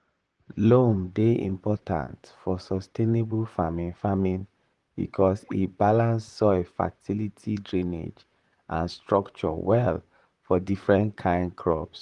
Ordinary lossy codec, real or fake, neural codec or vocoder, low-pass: Opus, 24 kbps; fake; vocoder, 44.1 kHz, 128 mel bands, Pupu-Vocoder; 10.8 kHz